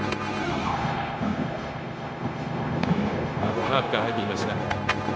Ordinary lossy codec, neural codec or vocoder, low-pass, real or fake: none; codec, 16 kHz, 0.9 kbps, LongCat-Audio-Codec; none; fake